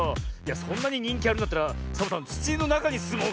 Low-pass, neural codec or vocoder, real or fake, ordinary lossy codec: none; none; real; none